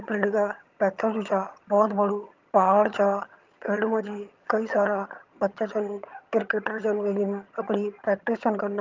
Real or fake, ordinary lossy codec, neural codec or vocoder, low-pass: fake; Opus, 24 kbps; vocoder, 22.05 kHz, 80 mel bands, HiFi-GAN; 7.2 kHz